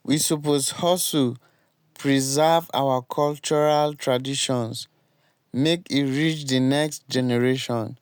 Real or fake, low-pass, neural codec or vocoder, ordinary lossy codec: real; none; none; none